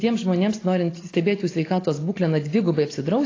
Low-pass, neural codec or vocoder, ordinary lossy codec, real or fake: 7.2 kHz; none; AAC, 32 kbps; real